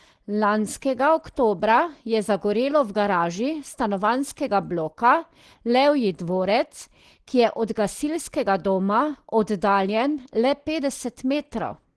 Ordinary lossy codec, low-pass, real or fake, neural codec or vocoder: Opus, 16 kbps; 10.8 kHz; real; none